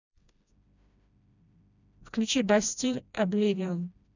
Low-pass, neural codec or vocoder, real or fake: 7.2 kHz; codec, 16 kHz, 1 kbps, FreqCodec, smaller model; fake